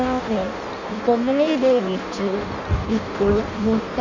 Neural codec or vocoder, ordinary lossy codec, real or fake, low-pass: codec, 16 kHz in and 24 kHz out, 0.6 kbps, FireRedTTS-2 codec; Opus, 64 kbps; fake; 7.2 kHz